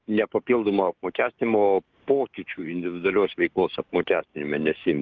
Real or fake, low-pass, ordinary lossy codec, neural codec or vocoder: fake; 7.2 kHz; Opus, 24 kbps; codec, 16 kHz, 6 kbps, DAC